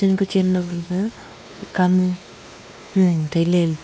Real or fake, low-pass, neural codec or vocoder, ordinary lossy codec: fake; none; codec, 16 kHz, 2 kbps, X-Codec, WavLM features, trained on Multilingual LibriSpeech; none